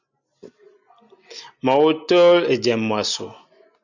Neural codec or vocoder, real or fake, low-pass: none; real; 7.2 kHz